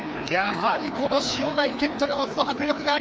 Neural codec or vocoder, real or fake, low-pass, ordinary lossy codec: codec, 16 kHz, 2 kbps, FreqCodec, larger model; fake; none; none